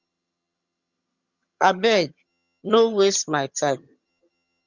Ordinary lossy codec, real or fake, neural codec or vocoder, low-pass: Opus, 64 kbps; fake; vocoder, 22.05 kHz, 80 mel bands, HiFi-GAN; 7.2 kHz